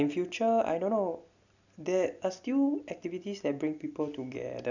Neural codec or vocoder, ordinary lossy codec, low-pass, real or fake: none; none; 7.2 kHz; real